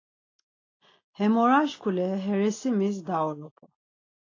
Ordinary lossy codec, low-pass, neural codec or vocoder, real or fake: AAC, 32 kbps; 7.2 kHz; none; real